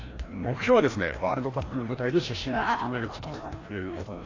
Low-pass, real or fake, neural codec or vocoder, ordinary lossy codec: 7.2 kHz; fake; codec, 16 kHz, 1 kbps, FreqCodec, larger model; AAC, 32 kbps